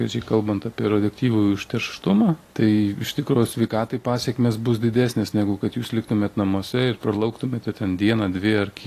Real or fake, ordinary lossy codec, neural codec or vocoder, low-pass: real; AAC, 48 kbps; none; 14.4 kHz